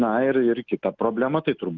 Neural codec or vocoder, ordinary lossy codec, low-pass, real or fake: none; Opus, 24 kbps; 7.2 kHz; real